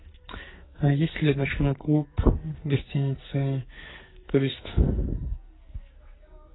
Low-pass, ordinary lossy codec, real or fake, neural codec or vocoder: 7.2 kHz; AAC, 16 kbps; fake; codec, 44.1 kHz, 2.6 kbps, SNAC